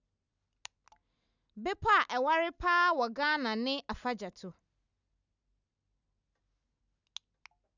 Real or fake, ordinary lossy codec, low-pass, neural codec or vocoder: real; none; 7.2 kHz; none